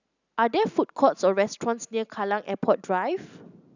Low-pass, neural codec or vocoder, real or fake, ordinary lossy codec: 7.2 kHz; none; real; none